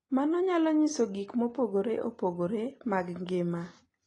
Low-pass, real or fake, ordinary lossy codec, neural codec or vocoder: 10.8 kHz; real; AAC, 32 kbps; none